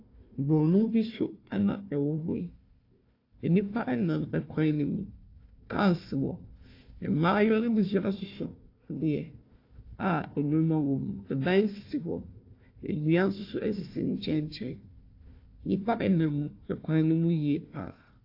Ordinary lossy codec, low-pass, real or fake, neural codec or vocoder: AAC, 32 kbps; 5.4 kHz; fake; codec, 16 kHz, 1 kbps, FunCodec, trained on Chinese and English, 50 frames a second